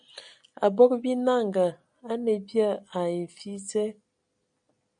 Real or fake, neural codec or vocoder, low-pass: real; none; 9.9 kHz